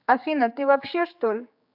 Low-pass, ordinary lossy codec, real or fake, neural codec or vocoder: 5.4 kHz; AAC, 48 kbps; fake; codec, 16 kHz, 4 kbps, X-Codec, HuBERT features, trained on general audio